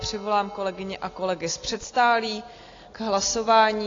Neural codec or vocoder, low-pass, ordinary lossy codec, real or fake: none; 7.2 kHz; AAC, 32 kbps; real